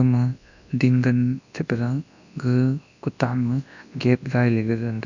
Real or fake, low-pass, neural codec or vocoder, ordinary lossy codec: fake; 7.2 kHz; codec, 24 kHz, 0.9 kbps, WavTokenizer, large speech release; none